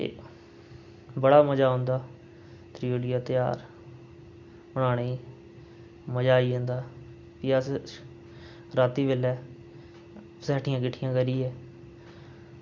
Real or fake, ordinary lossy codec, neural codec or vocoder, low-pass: real; none; none; none